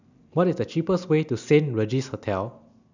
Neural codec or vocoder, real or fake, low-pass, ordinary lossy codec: none; real; 7.2 kHz; none